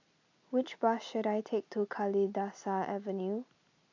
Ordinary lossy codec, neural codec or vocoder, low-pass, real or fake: AAC, 48 kbps; none; 7.2 kHz; real